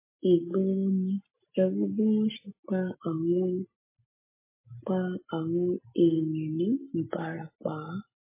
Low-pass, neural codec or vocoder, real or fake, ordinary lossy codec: 3.6 kHz; none; real; MP3, 16 kbps